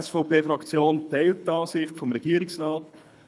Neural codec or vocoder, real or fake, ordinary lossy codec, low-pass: codec, 24 kHz, 3 kbps, HILCodec; fake; none; none